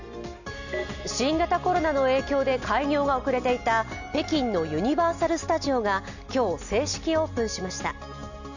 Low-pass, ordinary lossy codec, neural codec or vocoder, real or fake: 7.2 kHz; none; none; real